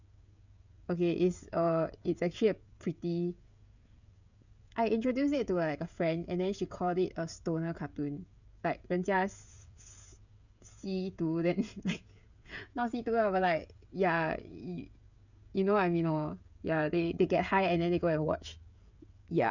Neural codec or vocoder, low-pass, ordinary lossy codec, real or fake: codec, 16 kHz, 16 kbps, FreqCodec, smaller model; 7.2 kHz; none; fake